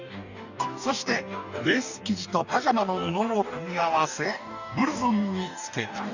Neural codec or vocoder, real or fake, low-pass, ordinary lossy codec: codec, 44.1 kHz, 2.6 kbps, DAC; fake; 7.2 kHz; none